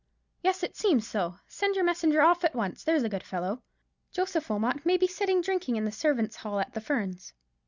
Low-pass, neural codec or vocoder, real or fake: 7.2 kHz; none; real